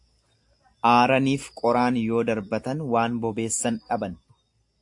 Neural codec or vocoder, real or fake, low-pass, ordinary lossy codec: none; real; 10.8 kHz; AAC, 64 kbps